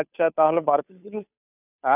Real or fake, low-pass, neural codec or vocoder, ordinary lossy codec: fake; 3.6 kHz; codec, 16 kHz, 2 kbps, FunCodec, trained on Chinese and English, 25 frames a second; none